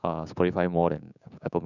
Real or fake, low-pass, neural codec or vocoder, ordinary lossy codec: fake; 7.2 kHz; codec, 16 kHz, 6 kbps, DAC; none